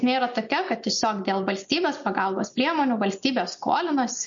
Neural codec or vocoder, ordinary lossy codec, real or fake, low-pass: none; MP3, 48 kbps; real; 7.2 kHz